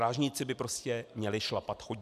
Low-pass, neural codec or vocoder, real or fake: 14.4 kHz; none; real